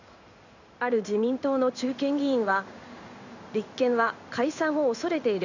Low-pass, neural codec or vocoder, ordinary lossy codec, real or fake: 7.2 kHz; codec, 16 kHz in and 24 kHz out, 1 kbps, XY-Tokenizer; none; fake